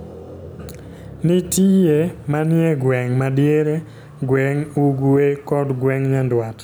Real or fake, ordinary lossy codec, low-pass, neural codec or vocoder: real; none; none; none